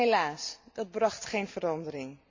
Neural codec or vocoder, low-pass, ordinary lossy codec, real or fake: none; 7.2 kHz; MP3, 64 kbps; real